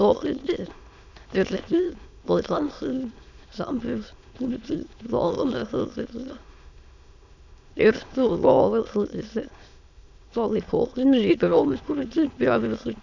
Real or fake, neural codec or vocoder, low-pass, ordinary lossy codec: fake; autoencoder, 22.05 kHz, a latent of 192 numbers a frame, VITS, trained on many speakers; 7.2 kHz; none